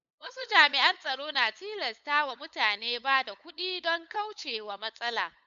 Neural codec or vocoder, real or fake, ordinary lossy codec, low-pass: codec, 16 kHz, 8 kbps, FunCodec, trained on LibriTTS, 25 frames a second; fake; none; 7.2 kHz